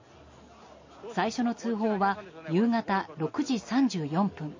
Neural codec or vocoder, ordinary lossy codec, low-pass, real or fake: none; MP3, 32 kbps; 7.2 kHz; real